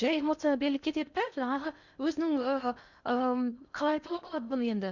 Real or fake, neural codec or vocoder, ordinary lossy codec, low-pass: fake; codec, 16 kHz in and 24 kHz out, 0.8 kbps, FocalCodec, streaming, 65536 codes; none; 7.2 kHz